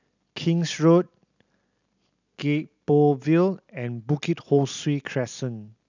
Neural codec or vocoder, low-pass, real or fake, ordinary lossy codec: none; 7.2 kHz; real; none